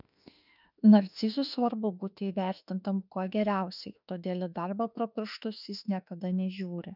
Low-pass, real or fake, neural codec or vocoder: 5.4 kHz; fake; autoencoder, 48 kHz, 32 numbers a frame, DAC-VAE, trained on Japanese speech